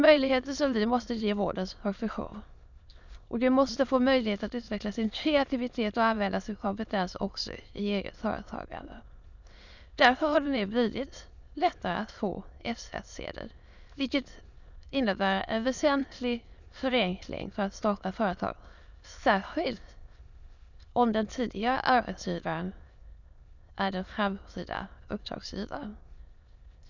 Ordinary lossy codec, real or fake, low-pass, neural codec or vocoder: none; fake; 7.2 kHz; autoencoder, 22.05 kHz, a latent of 192 numbers a frame, VITS, trained on many speakers